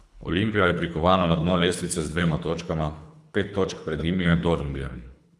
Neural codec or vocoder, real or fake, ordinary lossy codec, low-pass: codec, 24 kHz, 3 kbps, HILCodec; fake; none; none